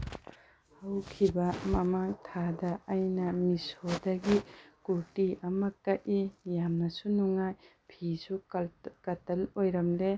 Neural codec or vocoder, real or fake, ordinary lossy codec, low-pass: none; real; none; none